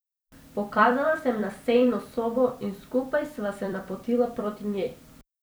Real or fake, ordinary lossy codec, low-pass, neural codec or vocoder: fake; none; none; vocoder, 44.1 kHz, 128 mel bands every 256 samples, BigVGAN v2